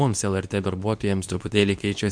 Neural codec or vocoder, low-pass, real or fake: codec, 24 kHz, 0.9 kbps, WavTokenizer, medium speech release version 2; 9.9 kHz; fake